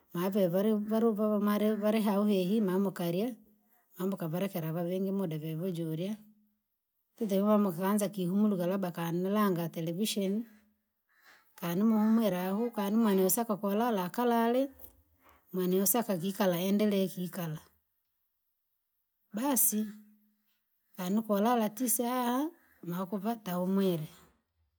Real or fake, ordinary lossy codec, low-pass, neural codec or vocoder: real; none; none; none